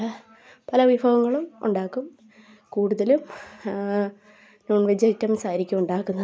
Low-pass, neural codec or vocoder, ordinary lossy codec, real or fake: none; none; none; real